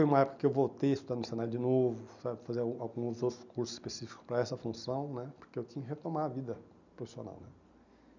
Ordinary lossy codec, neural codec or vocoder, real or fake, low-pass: none; none; real; 7.2 kHz